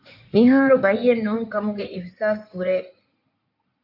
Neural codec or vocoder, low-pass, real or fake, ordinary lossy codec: codec, 16 kHz in and 24 kHz out, 2.2 kbps, FireRedTTS-2 codec; 5.4 kHz; fake; MP3, 48 kbps